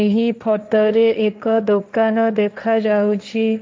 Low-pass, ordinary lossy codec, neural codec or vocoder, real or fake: none; none; codec, 16 kHz, 1.1 kbps, Voila-Tokenizer; fake